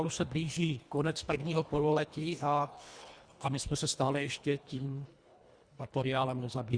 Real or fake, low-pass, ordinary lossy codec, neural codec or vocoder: fake; 9.9 kHz; Opus, 64 kbps; codec, 24 kHz, 1.5 kbps, HILCodec